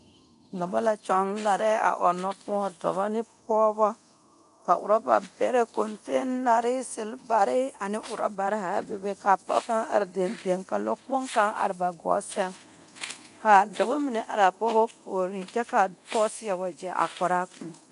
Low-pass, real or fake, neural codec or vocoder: 10.8 kHz; fake; codec, 24 kHz, 0.9 kbps, DualCodec